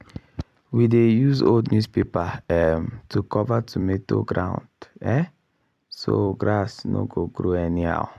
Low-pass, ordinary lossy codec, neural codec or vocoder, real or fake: 14.4 kHz; AAC, 96 kbps; vocoder, 44.1 kHz, 128 mel bands every 256 samples, BigVGAN v2; fake